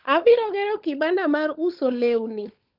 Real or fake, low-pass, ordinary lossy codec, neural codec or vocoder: fake; 5.4 kHz; Opus, 32 kbps; codec, 16 kHz, 16 kbps, FunCodec, trained on LibriTTS, 50 frames a second